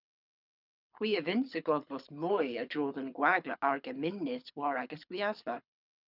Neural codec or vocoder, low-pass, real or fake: codec, 24 kHz, 6 kbps, HILCodec; 5.4 kHz; fake